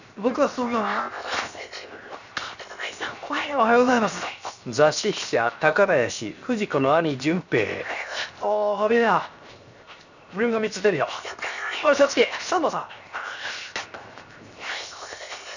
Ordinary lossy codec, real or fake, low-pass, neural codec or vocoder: none; fake; 7.2 kHz; codec, 16 kHz, 0.7 kbps, FocalCodec